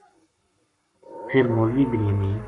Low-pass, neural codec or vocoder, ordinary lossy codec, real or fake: 10.8 kHz; codec, 44.1 kHz, 7.8 kbps, Pupu-Codec; MP3, 96 kbps; fake